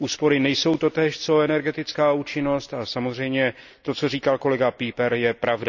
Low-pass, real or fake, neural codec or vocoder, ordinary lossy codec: 7.2 kHz; real; none; none